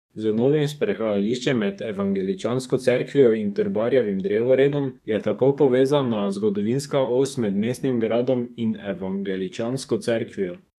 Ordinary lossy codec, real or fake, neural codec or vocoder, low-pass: none; fake; codec, 32 kHz, 1.9 kbps, SNAC; 14.4 kHz